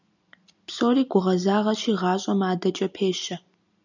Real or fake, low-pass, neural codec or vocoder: real; 7.2 kHz; none